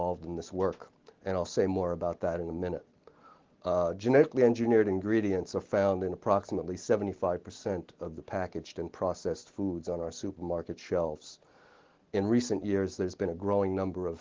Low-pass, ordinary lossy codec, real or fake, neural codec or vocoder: 7.2 kHz; Opus, 16 kbps; real; none